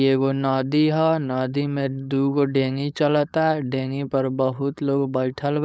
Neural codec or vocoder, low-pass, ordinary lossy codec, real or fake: codec, 16 kHz, 4.8 kbps, FACodec; none; none; fake